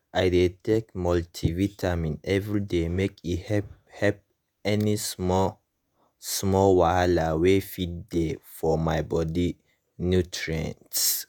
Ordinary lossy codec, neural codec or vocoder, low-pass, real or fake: none; vocoder, 48 kHz, 128 mel bands, Vocos; none; fake